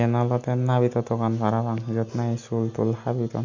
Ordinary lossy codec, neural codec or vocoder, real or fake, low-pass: MP3, 48 kbps; none; real; 7.2 kHz